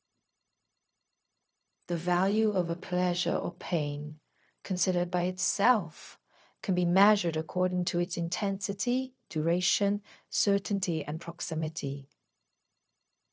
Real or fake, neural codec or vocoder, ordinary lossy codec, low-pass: fake; codec, 16 kHz, 0.4 kbps, LongCat-Audio-Codec; none; none